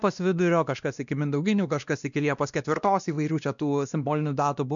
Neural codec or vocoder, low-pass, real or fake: codec, 16 kHz, 1 kbps, X-Codec, WavLM features, trained on Multilingual LibriSpeech; 7.2 kHz; fake